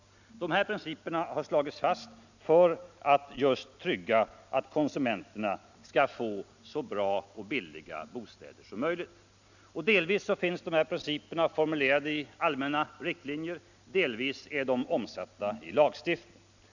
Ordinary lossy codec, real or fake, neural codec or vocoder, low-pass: none; real; none; 7.2 kHz